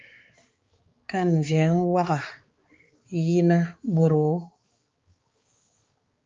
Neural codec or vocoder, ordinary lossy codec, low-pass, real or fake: codec, 16 kHz, 4 kbps, X-Codec, HuBERT features, trained on balanced general audio; Opus, 32 kbps; 7.2 kHz; fake